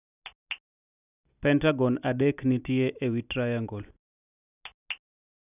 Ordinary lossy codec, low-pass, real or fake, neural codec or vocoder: none; 3.6 kHz; real; none